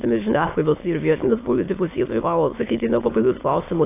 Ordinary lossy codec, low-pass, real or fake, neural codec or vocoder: AAC, 24 kbps; 3.6 kHz; fake; autoencoder, 22.05 kHz, a latent of 192 numbers a frame, VITS, trained on many speakers